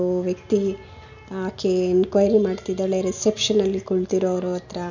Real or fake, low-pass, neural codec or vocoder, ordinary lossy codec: real; 7.2 kHz; none; none